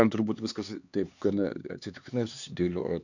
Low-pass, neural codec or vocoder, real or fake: 7.2 kHz; codec, 16 kHz, 4 kbps, X-Codec, HuBERT features, trained on LibriSpeech; fake